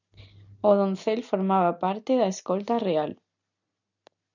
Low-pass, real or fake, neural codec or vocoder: 7.2 kHz; real; none